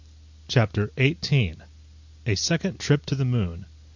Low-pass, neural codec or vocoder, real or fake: 7.2 kHz; none; real